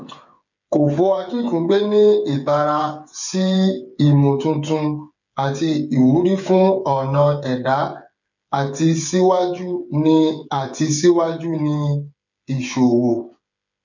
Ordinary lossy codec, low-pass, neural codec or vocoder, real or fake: none; 7.2 kHz; codec, 16 kHz, 8 kbps, FreqCodec, smaller model; fake